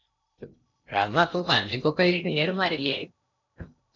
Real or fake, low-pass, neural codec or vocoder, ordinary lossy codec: fake; 7.2 kHz; codec, 16 kHz in and 24 kHz out, 0.8 kbps, FocalCodec, streaming, 65536 codes; MP3, 64 kbps